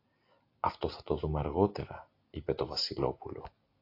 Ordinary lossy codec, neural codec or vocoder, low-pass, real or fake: AAC, 32 kbps; none; 5.4 kHz; real